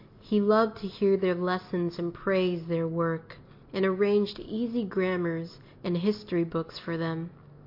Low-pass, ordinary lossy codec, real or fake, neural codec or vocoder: 5.4 kHz; MP3, 32 kbps; real; none